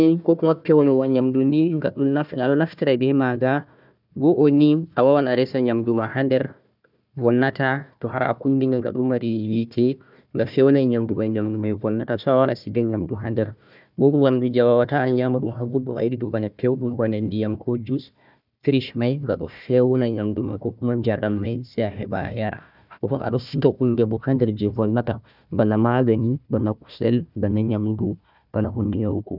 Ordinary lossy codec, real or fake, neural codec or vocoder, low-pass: none; fake; codec, 16 kHz, 1 kbps, FunCodec, trained on Chinese and English, 50 frames a second; 5.4 kHz